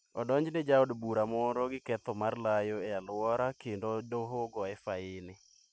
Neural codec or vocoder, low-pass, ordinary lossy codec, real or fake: none; none; none; real